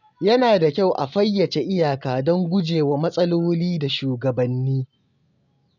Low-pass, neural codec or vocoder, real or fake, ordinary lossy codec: 7.2 kHz; none; real; none